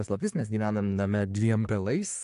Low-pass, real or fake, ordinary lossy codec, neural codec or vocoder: 10.8 kHz; fake; AAC, 64 kbps; codec, 24 kHz, 1 kbps, SNAC